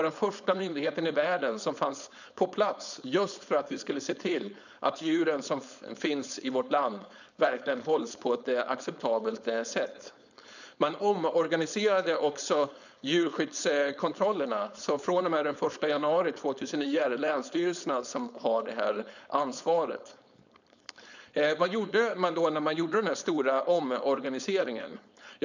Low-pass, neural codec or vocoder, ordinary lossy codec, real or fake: 7.2 kHz; codec, 16 kHz, 4.8 kbps, FACodec; none; fake